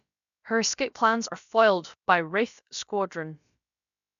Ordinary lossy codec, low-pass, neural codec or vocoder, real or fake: none; 7.2 kHz; codec, 16 kHz, about 1 kbps, DyCAST, with the encoder's durations; fake